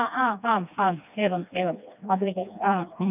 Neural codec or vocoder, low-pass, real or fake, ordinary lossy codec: codec, 16 kHz, 2 kbps, FreqCodec, smaller model; 3.6 kHz; fake; none